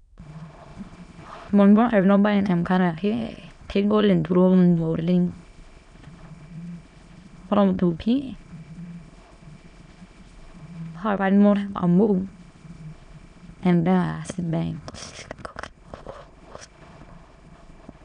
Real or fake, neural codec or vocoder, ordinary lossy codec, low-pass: fake; autoencoder, 22.05 kHz, a latent of 192 numbers a frame, VITS, trained on many speakers; none; 9.9 kHz